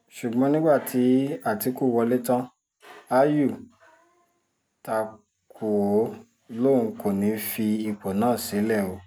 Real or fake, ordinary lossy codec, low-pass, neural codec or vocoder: real; none; none; none